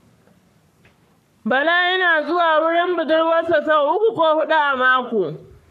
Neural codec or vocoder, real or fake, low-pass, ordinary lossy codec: codec, 44.1 kHz, 3.4 kbps, Pupu-Codec; fake; 14.4 kHz; none